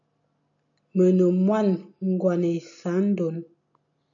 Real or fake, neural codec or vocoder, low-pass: real; none; 7.2 kHz